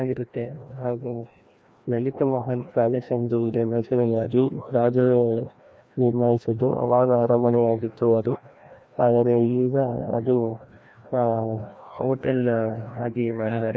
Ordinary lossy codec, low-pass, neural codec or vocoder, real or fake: none; none; codec, 16 kHz, 1 kbps, FreqCodec, larger model; fake